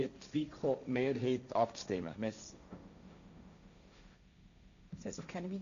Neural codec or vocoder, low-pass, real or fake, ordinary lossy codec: codec, 16 kHz, 1.1 kbps, Voila-Tokenizer; 7.2 kHz; fake; none